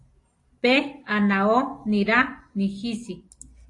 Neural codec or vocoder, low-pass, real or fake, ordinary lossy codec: none; 10.8 kHz; real; AAC, 48 kbps